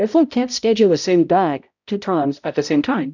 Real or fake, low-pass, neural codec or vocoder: fake; 7.2 kHz; codec, 16 kHz, 0.5 kbps, X-Codec, HuBERT features, trained on balanced general audio